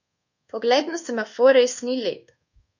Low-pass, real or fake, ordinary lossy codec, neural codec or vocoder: 7.2 kHz; fake; none; codec, 24 kHz, 1.2 kbps, DualCodec